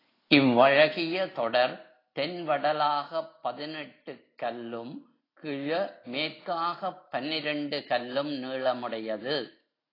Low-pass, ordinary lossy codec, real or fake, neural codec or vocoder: 5.4 kHz; AAC, 24 kbps; real; none